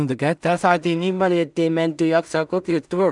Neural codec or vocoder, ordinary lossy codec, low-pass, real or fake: codec, 16 kHz in and 24 kHz out, 0.4 kbps, LongCat-Audio-Codec, two codebook decoder; none; 10.8 kHz; fake